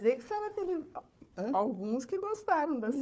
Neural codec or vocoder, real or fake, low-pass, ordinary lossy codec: codec, 16 kHz, 4 kbps, FunCodec, trained on Chinese and English, 50 frames a second; fake; none; none